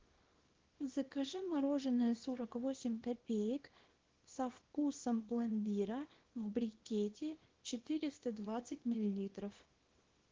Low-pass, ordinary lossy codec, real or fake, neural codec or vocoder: 7.2 kHz; Opus, 16 kbps; fake; codec, 24 kHz, 0.9 kbps, WavTokenizer, small release